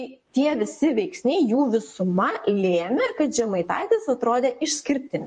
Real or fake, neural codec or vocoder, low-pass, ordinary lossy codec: fake; vocoder, 44.1 kHz, 128 mel bands, Pupu-Vocoder; 10.8 kHz; MP3, 48 kbps